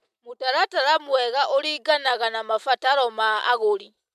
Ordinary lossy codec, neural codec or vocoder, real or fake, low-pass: MP3, 96 kbps; none; real; 14.4 kHz